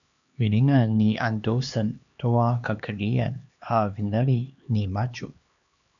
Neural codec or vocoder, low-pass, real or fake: codec, 16 kHz, 2 kbps, X-Codec, HuBERT features, trained on LibriSpeech; 7.2 kHz; fake